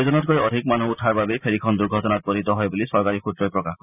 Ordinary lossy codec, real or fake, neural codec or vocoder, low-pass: none; real; none; 3.6 kHz